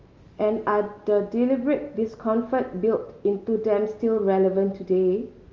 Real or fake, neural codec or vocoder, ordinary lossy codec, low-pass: real; none; Opus, 32 kbps; 7.2 kHz